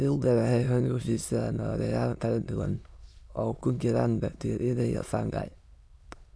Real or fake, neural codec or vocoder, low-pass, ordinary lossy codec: fake; autoencoder, 22.05 kHz, a latent of 192 numbers a frame, VITS, trained on many speakers; none; none